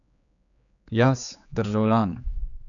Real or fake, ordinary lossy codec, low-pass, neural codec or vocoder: fake; none; 7.2 kHz; codec, 16 kHz, 4 kbps, X-Codec, HuBERT features, trained on general audio